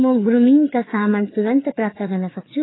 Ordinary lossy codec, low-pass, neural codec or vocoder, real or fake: AAC, 16 kbps; 7.2 kHz; codec, 16 kHz, 4 kbps, FreqCodec, larger model; fake